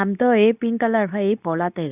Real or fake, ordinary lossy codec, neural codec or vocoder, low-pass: fake; none; codec, 24 kHz, 0.9 kbps, WavTokenizer, medium speech release version 2; 3.6 kHz